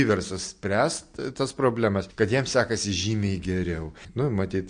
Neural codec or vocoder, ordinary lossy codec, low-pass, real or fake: none; MP3, 48 kbps; 9.9 kHz; real